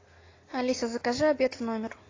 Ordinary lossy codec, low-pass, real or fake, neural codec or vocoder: AAC, 32 kbps; 7.2 kHz; real; none